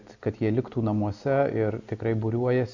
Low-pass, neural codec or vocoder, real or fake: 7.2 kHz; none; real